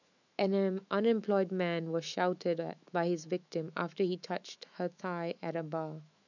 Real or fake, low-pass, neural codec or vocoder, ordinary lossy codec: fake; 7.2 kHz; autoencoder, 48 kHz, 128 numbers a frame, DAC-VAE, trained on Japanese speech; none